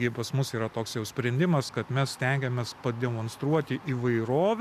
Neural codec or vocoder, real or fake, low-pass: none; real; 14.4 kHz